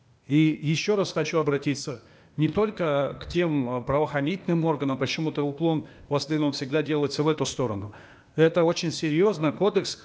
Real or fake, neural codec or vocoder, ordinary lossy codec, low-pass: fake; codec, 16 kHz, 0.8 kbps, ZipCodec; none; none